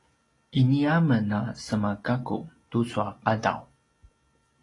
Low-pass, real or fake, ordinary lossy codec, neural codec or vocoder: 10.8 kHz; real; AAC, 32 kbps; none